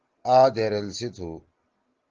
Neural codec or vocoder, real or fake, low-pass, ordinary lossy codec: none; real; 7.2 kHz; Opus, 16 kbps